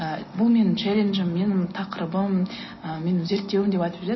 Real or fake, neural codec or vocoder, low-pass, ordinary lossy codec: real; none; 7.2 kHz; MP3, 24 kbps